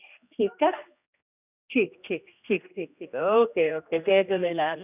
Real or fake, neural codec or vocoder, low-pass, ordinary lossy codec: fake; codec, 16 kHz, 1 kbps, X-Codec, HuBERT features, trained on general audio; 3.6 kHz; none